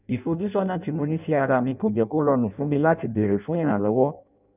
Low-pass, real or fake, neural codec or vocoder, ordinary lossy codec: 3.6 kHz; fake; codec, 16 kHz in and 24 kHz out, 0.6 kbps, FireRedTTS-2 codec; none